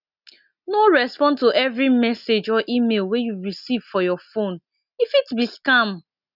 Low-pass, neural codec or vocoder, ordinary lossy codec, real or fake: 5.4 kHz; none; none; real